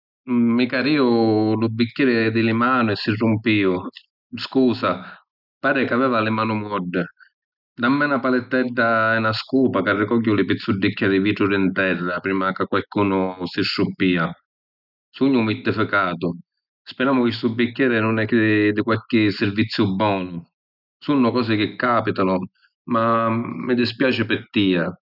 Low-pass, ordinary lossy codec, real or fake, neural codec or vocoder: 5.4 kHz; none; real; none